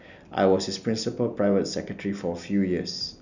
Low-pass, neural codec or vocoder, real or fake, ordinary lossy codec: 7.2 kHz; none; real; none